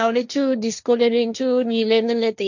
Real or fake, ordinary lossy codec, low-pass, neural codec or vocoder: fake; none; 7.2 kHz; codec, 16 kHz, 1.1 kbps, Voila-Tokenizer